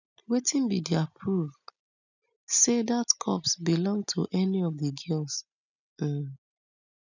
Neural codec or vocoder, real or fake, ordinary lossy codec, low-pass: none; real; none; 7.2 kHz